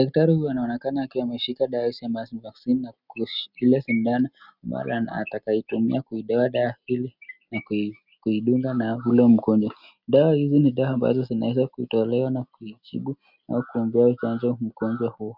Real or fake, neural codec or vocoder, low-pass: real; none; 5.4 kHz